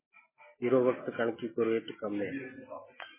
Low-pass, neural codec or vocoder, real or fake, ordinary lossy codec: 3.6 kHz; none; real; MP3, 16 kbps